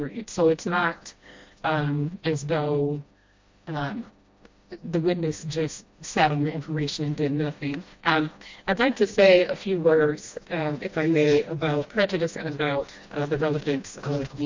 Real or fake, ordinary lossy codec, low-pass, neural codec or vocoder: fake; MP3, 48 kbps; 7.2 kHz; codec, 16 kHz, 1 kbps, FreqCodec, smaller model